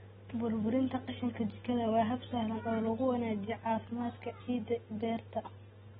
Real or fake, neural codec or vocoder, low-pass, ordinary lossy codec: real; none; 10.8 kHz; AAC, 16 kbps